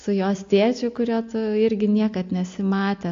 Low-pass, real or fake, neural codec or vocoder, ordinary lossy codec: 7.2 kHz; real; none; AAC, 48 kbps